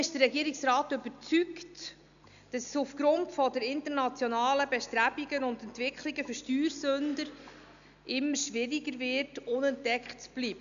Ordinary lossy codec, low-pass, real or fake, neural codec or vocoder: none; 7.2 kHz; real; none